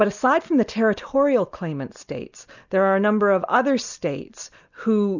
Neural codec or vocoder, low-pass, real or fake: none; 7.2 kHz; real